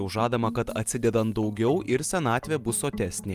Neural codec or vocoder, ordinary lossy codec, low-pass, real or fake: none; Opus, 32 kbps; 14.4 kHz; real